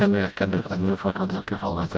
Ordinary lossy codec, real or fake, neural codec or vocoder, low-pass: none; fake; codec, 16 kHz, 0.5 kbps, FreqCodec, smaller model; none